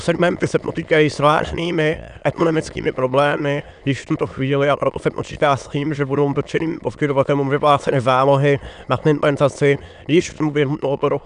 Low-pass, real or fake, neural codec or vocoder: 9.9 kHz; fake; autoencoder, 22.05 kHz, a latent of 192 numbers a frame, VITS, trained on many speakers